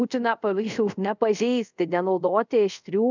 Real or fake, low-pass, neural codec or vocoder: fake; 7.2 kHz; codec, 24 kHz, 0.5 kbps, DualCodec